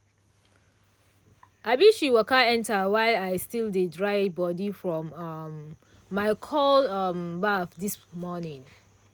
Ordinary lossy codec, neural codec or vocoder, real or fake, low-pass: none; none; real; none